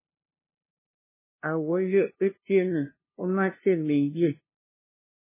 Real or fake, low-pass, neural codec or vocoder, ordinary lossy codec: fake; 3.6 kHz; codec, 16 kHz, 0.5 kbps, FunCodec, trained on LibriTTS, 25 frames a second; MP3, 16 kbps